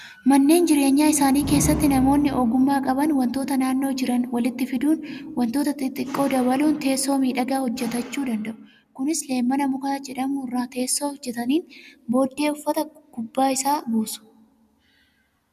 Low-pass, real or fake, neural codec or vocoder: 14.4 kHz; real; none